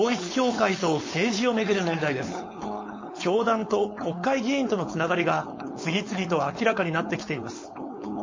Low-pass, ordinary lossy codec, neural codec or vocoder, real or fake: 7.2 kHz; MP3, 32 kbps; codec, 16 kHz, 4.8 kbps, FACodec; fake